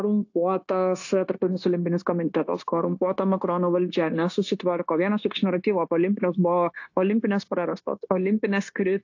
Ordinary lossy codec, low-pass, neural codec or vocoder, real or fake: AAC, 48 kbps; 7.2 kHz; codec, 16 kHz, 0.9 kbps, LongCat-Audio-Codec; fake